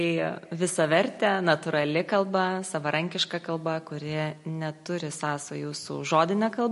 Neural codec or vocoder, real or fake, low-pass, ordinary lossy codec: none; real; 14.4 kHz; MP3, 48 kbps